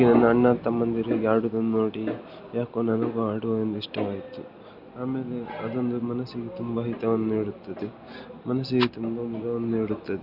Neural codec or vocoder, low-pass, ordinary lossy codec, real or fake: none; 5.4 kHz; Opus, 64 kbps; real